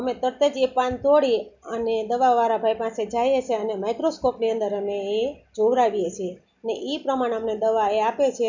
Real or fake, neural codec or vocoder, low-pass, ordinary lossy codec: real; none; 7.2 kHz; none